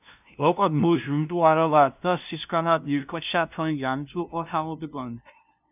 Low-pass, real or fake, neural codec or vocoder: 3.6 kHz; fake; codec, 16 kHz, 0.5 kbps, FunCodec, trained on LibriTTS, 25 frames a second